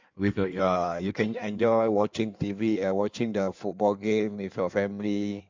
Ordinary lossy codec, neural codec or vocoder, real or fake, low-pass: MP3, 64 kbps; codec, 16 kHz in and 24 kHz out, 1.1 kbps, FireRedTTS-2 codec; fake; 7.2 kHz